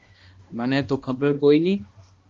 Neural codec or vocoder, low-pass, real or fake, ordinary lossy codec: codec, 16 kHz, 1 kbps, X-Codec, HuBERT features, trained on balanced general audio; 7.2 kHz; fake; Opus, 32 kbps